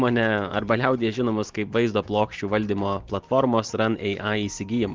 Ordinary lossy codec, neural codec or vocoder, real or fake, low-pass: Opus, 16 kbps; none; real; 7.2 kHz